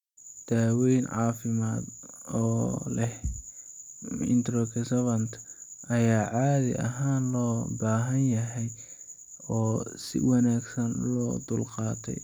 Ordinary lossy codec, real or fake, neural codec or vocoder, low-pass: none; real; none; 19.8 kHz